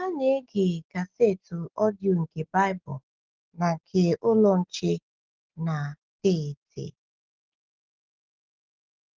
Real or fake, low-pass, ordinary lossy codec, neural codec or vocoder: real; 7.2 kHz; Opus, 16 kbps; none